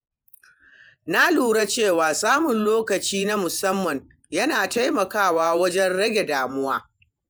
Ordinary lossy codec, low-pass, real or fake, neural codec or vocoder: none; none; fake; vocoder, 48 kHz, 128 mel bands, Vocos